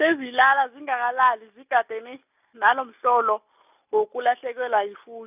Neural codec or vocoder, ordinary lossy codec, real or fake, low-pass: none; none; real; 3.6 kHz